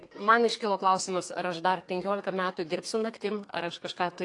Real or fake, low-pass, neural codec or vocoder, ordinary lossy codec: fake; 10.8 kHz; codec, 32 kHz, 1.9 kbps, SNAC; AAC, 48 kbps